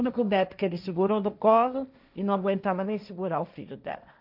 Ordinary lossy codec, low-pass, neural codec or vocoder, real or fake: none; 5.4 kHz; codec, 16 kHz, 1.1 kbps, Voila-Tokenizer; fake